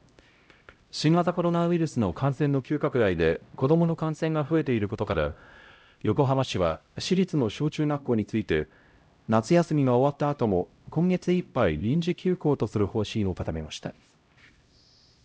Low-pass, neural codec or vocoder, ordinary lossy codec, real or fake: none; codec, 16 kHz, 0.5 kbps, X-Codec, HuBERT features, trained on LibriSpeech; none; fake